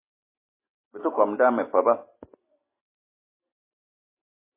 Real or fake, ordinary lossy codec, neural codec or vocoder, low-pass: real; MP3, 16 kbps; none; 3.6 kHz